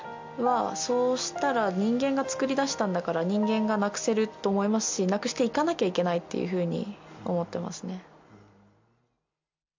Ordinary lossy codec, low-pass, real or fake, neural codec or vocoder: MP3, 64 kbps; 7.2 kHz; real; none